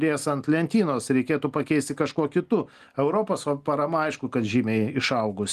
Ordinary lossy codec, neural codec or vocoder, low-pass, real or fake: Opus, 24 kbps; none; 14.4 kHz; real